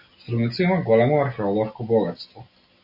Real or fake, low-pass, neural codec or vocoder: real; 5.4 kHz; none